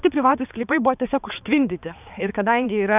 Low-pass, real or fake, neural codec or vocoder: 3.6 kHz; fake; codec, 16 kHz, 4 kbps, X-Codec, HuBERT features, trained on balanced general audio